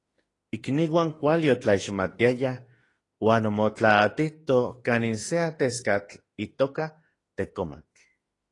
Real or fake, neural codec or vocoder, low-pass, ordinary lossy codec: fake; autoencoder, 48 kHz, 32 numbers a frame, DAC-VAE, trained on Japanese speech; 10.8 kHz; AAC, 32 kbps